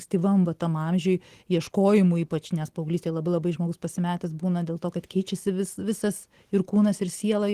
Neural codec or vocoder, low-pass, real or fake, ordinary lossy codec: none; 14.4 kHz; real; Opus, 16 kbps